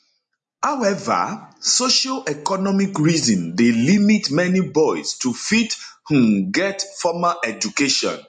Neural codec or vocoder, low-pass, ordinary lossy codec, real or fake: none; 10.8 kHz; MP3, 48 kbps; real